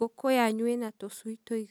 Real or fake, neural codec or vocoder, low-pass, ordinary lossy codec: real; none; none; none